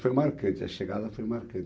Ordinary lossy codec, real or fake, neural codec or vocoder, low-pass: none; real; none; none